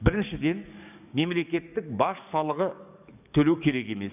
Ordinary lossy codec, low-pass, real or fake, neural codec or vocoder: none; 3.6 kHz; fake; codec, 44.1 kHz, 7.8 kbps, DAC